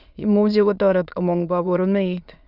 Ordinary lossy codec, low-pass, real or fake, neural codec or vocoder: none; 5.4 kHz; fake; autoencoder, 22.05 kHz, a latent of 192 numbers a frame, VITS, trained on many speakers